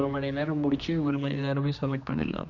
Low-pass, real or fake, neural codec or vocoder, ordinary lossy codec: 7.2 kHz; fake; codec, 16 kHz, 2 kbps, X-Codec, HuBERT features, trained on general audio; none